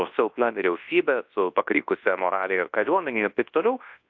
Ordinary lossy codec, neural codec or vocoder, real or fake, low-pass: AAC, 48 kbps; codec, 24 kHz, 0.9 kbps, WavTokenizer, large speech release; fake; 7.2 kHz